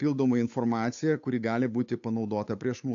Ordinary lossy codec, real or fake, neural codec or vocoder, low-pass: AAC, 48 kbps; fake; codec, 16 kHz, 8 kbps, FunCodec, trained on Chinese and English, 25 frames a second; 7.2 kHz